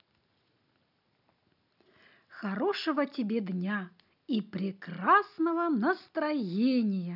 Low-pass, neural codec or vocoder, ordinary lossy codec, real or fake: 5.4 kHz; none; none; real